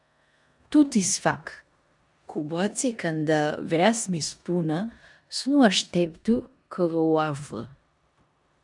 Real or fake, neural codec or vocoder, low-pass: fake; codec, 16 kHz in and 24 kHz out, 0.9 kbps, LongCat-Audio-Codec, four codebook decoder; 10.8 kHz